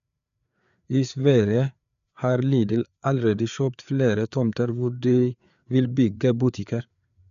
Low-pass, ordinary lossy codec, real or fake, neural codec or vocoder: 7.2 kHz; none; fake; codec, 16 kHz, 4 kbps, FreqCodec, larger model